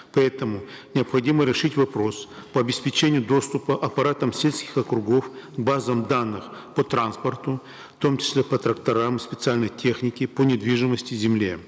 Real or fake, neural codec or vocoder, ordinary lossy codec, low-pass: real; none; none; none